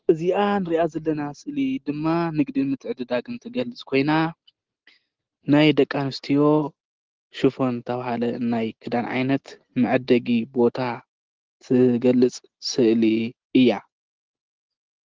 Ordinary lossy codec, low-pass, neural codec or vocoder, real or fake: Opus, 16 kbps; 7.2 kHz; none; real